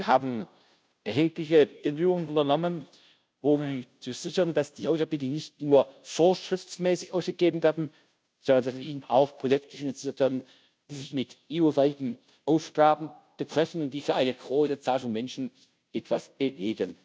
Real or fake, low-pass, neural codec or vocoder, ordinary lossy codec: fake; none; codec, 16 kHz, 0.5 kbps, FunCodec, trained on Chinese and English, 25 frames a second; none